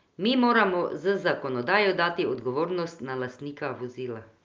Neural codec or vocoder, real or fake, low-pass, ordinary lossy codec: none; real; 7.2 kHz; Opus, 24 kbps